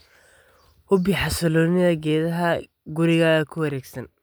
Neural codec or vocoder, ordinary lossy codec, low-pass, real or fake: none; none; none; real